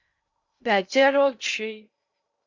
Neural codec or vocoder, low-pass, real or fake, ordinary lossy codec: codec, 16 kHz in and 24 kHz out, 0.6 kbps, FocalCodec, streaming, 2048 codes; 7.2 kHz; fake; Opus, 64 kbps